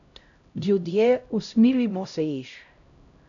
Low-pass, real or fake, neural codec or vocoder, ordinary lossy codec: 7.2 kHz; fake; codec, 16 kHz, 0.5 kbps, X-Codec, HuBERT features, trained on LibriSpeech; none